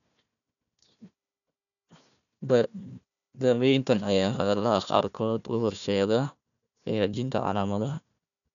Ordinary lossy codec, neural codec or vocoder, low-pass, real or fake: none; codec, 16 kHz, 1 kbps, FunCodec, trained on Chinese and English, 50 frames a second; 7.2 kHz; fake